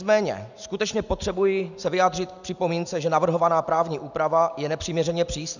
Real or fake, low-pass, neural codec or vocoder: real; 7.2 kHz; none